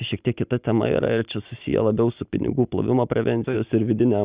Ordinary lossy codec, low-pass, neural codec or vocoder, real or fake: Opus, 64 kbps; 3.6 kHz; none; real